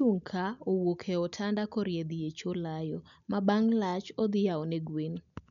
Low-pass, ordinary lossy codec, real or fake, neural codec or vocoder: 7.2 kHz; none; real; none